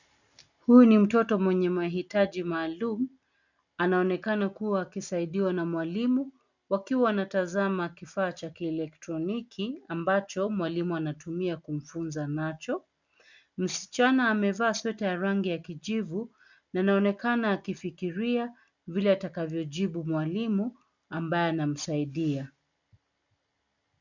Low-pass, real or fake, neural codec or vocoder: 7.2 kHz; real; none